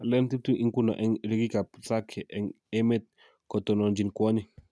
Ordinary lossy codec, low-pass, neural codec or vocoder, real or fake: none; none; none; real